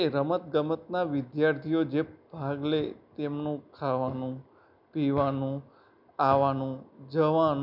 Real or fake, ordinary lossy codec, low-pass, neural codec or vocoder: real; none; 5.4 kHz; none